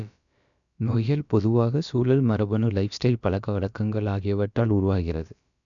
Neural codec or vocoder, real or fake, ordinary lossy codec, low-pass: codec, 16 kHz, about 1 kbps, DyCAST, with the encoder's durations; fake; none; 7.2 kHz